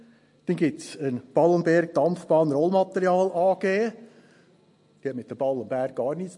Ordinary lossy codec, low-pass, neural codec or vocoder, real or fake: MP3, 48 kbps; 10.8 kHz; none; real